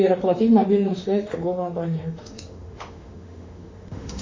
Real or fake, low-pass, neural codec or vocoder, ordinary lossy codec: fake; 7.2 kHz; autoencoder, 48 kHz, 32 numbers a frame, DAC-VAE, trained on Japanese speech; MP3, 64 kbps